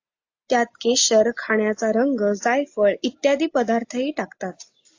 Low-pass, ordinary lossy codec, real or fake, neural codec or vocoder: 7.2 kHz; AAC, 48 kbps; real; none